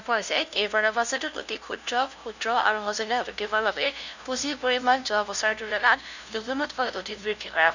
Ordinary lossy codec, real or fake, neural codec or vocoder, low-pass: none; fake; codec, 16 kHz, 0.5 kbps, FunCodec, trained on LibriTTS, 25 frames a second; 7.2 kHz